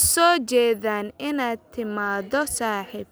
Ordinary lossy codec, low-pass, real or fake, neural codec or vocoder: none; none; real; none